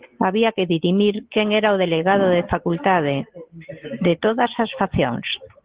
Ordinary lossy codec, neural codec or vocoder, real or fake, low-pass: Opus, 16 kbps; none; real; 3.6 kHz